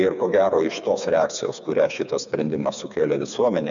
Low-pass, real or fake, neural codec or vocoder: 7.2 kHz; fake; codec, 16 kHz, 4 kbps, FreqCodec, smaller model